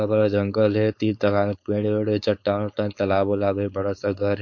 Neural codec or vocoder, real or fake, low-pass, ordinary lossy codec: codec, 16 kHz, 4.8 kbps, FACodec; fake; 7.2 kHz; MP3, 48 kbps